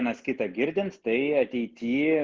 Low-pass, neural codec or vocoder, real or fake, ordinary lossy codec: 7.2 kHz; none; real; Opus, 16 kbps